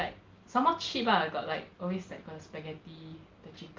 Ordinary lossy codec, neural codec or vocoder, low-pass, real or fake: Opus, 32 kbps; none; 7.2 kHz; real